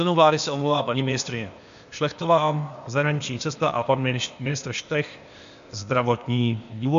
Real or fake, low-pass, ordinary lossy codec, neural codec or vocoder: fake; 7.2 kHz; MP3, 64 kbps; codec, 16 kHz, 0.8 kbps, ZipCodec